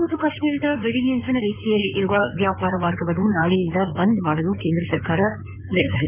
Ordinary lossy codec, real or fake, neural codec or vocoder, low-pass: none; fake; codec, 16 kHz in and 24 kHz out, 2.2 kbps, FireRedTTS-2 codec; 3.6 kHz